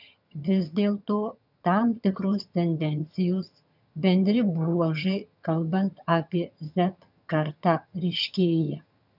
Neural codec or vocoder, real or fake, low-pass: vocoder, 22.05 kHz, 80 mel bands, HiFi-GAN; fake; 5.4 kHz